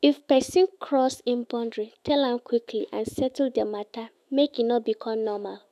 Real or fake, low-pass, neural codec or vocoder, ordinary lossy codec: fake; 14.4 kHz; autoencoder, 48 kHz, 128 numbers a frame, DAC-VAE, trained on Japanese speech; none